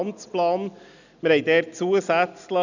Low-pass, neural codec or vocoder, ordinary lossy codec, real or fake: 7.2 kHz; none; none; real